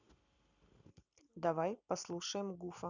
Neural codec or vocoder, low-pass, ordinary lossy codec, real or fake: none; 7.2 kHz; none; real